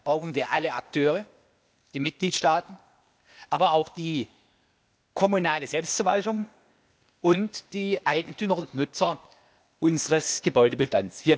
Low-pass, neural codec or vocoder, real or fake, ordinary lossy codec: none; codec, 16 kHz, 0.8 kbps, ZipCodec; fake; none